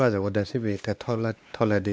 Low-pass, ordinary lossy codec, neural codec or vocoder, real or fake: none; none; codec, 16 kHz, 2 kbps, X-Codec, WavLM features, trained on Multilingual LibriSpeech; fake